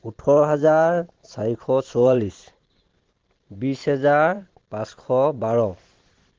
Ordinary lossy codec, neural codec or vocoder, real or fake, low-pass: Opus, 16 kbps; vocoder, 44.1 kHz, 128 mel bands every 512 samples, BigVGAN v2; fake; 7.2 kHz